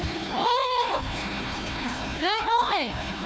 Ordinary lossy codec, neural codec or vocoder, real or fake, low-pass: none; codec, 16 kHz, 1 kbps, FunCodec, trained on Chinese and English, 50 frames a second; fake; none